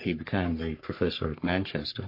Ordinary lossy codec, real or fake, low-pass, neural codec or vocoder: MP3, 32 kbps; fake; 5.4 kHz; codec, 44.1 kHz, 3.4 kbps, Pupu-Codec